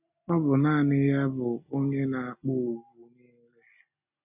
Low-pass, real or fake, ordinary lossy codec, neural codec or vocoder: 3.6 kHz; real; none; none